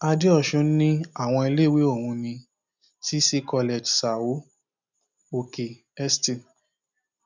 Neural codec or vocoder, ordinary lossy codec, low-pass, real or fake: none; none; 7.2 kHz; real